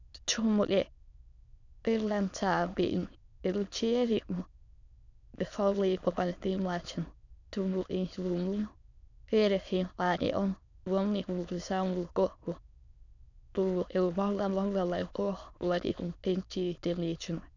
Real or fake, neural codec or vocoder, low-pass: fake; autoencoder, 22.05 kHz, a latent of 192 numbers a frame, VITS, trained on many speakers; 7.2 kHz